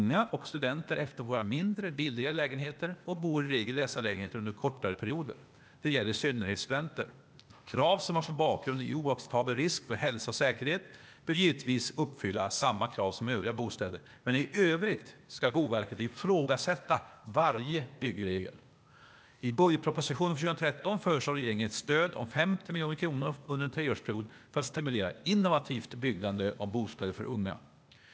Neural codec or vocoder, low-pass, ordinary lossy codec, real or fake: codec, 16 kHz, 0.8 kbps, ZipCodec; none; none; fake